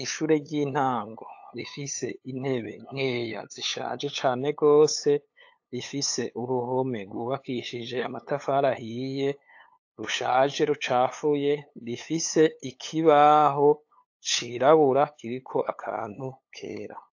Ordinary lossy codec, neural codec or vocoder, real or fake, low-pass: AAC, 48 kbps; codec, 16 kHz, 8 kbps, FunCodec, trained on LibriTTS, 25 frames a second; fake; 7.2 kHz